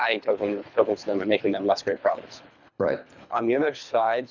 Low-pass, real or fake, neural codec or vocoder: 7.2 kHz; fake; codec, 24 kHz, 3 kbps, HILCodec